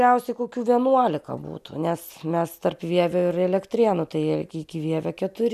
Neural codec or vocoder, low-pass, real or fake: none; 14.4 kHz; real